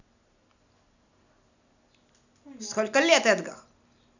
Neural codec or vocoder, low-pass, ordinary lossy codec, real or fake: none; 7.2 kHz; none; real